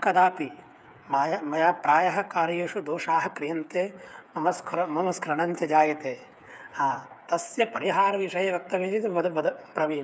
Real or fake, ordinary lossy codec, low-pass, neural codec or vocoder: fake; none; none; codec, 16 kHz, 8 kbps, FreqCodec, smaller model